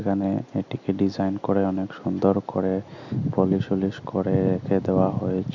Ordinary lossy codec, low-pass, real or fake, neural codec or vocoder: Opus, 64 kbps; 7.2 kHz; real; none